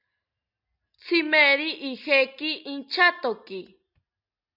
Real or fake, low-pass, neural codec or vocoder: real; 5.4 kHz; none